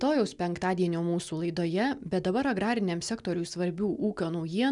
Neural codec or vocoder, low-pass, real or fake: none; 10.8 kHz; real